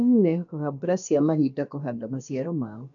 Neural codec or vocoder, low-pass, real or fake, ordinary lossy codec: codec, 16 kHz, about 1 kbps, DyCAST, with the encoder's durations; 7.2 kHz; fake; none